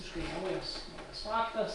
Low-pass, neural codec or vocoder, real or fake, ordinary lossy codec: 10.8 kHz; none; real; AAC, 48 kbps